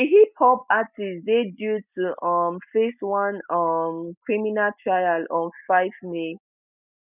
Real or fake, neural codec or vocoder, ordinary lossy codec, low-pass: real; none; none; 3.6 kHz